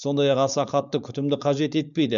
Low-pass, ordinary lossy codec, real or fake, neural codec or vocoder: 7.2 kHz; none; fake; codec, 16 kHz, 4.8 kbps, FACodec